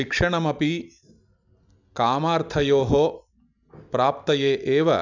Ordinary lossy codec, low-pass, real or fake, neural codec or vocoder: none; 7.2 kHz; real; none